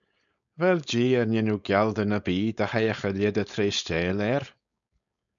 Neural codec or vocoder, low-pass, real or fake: codec, 16 kHz, 4.8 kbps, FACodec; 7.2 kHz; fake